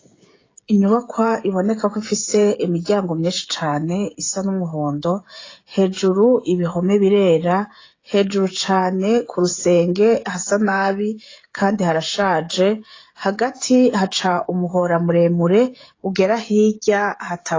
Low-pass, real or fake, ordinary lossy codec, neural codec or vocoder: 7.2 kHz; fake; AAC, 32 kbps; codec, 16 kHz, 16 kbps, FreqCodec, smaller model